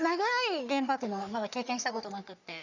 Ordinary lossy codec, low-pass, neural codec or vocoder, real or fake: none; 7.2 kHz; codec, 44.1 kHz, 3.4 kbps, Pupu-Codec; fake